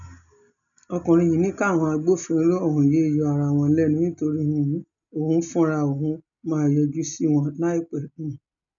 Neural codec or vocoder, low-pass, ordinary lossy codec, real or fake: none; 7.2 kHz; none; real